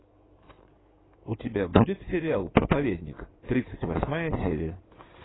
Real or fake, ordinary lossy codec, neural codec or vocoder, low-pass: fake; AAC, 16 kbps; codec, 16 kHz in and 24 kHz out, 2.2 kbps, FireRedTTS-2 codec; 7.2 kHz